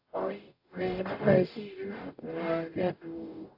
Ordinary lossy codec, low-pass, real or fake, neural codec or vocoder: AAC, 32 kbps; 5.4 kHz; fake; codec, 44.1 kHz, 0.9 kbps, DAC